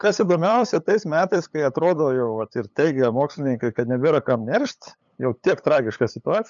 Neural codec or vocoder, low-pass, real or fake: codec, 16 kHz, 8 kbps, FunCodec, trained on LibriTTS, 25 frames a second; 7.2 kHz; fake